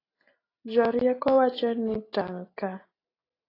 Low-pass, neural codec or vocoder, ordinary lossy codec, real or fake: 5.4 kHz; none; AAC, 24 kbps; real